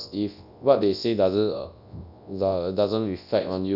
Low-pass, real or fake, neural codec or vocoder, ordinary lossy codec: 5.4 kHz; fake; codec, 24 kHz, 0.9 kbps, WavTokenizer, large speech release; none